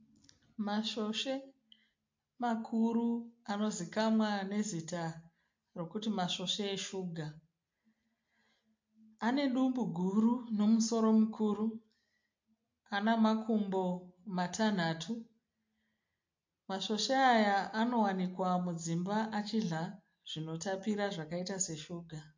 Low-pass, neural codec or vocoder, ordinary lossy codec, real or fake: 7.2 kHz; none; MP3, 48 kbps; real